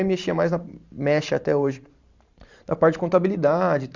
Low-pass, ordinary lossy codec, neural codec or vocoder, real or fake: 7.2 kHz; Opus, 64 kbps; vocoder, 44.1 kHz, 128 mel bands every 512 samples, BigVGAN v2; fake